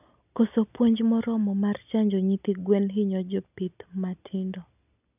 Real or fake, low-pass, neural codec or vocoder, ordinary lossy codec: real; 3.6 kHz; none; none